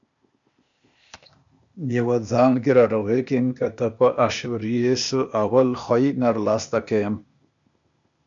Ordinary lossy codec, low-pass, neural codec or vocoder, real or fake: MP3, 64 kbps; 7.2 kHz; codec, 16 kHz, 0.8 kbps, ZipCodec; fake